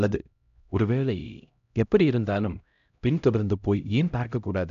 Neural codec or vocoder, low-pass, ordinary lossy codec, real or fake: codec, 16 kHz, 0.5 kbps, X-Codec, HuBERT features, trained on LibriSpeech; 7.2 kHz; none; fake